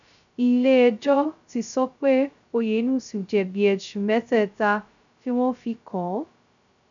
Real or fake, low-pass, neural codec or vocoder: fake; 7.2 kHz; codec, 16 kHz, 0.2 kbps, FocalCodec